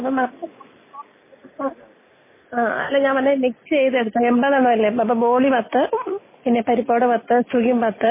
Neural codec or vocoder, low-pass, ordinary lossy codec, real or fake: none; 3.6 kHz; MP3, 16 kbps; real